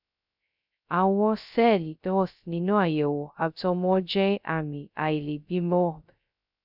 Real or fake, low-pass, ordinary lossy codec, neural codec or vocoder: fake; 5.4 kHz; none; codec, 16 kHz, 0.2 kbps, FocalCodec